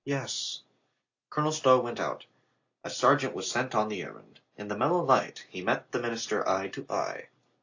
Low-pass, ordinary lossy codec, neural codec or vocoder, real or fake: 7.2 kHz; AAC, 48 kbps; none; real